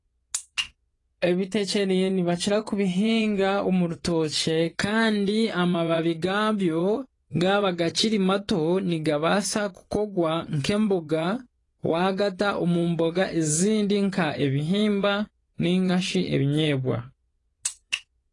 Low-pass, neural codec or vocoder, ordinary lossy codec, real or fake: 10.8 kHz; vocoder, 24 kHz, 100 mel bands, Vocos; AAC, 32 kbps; fake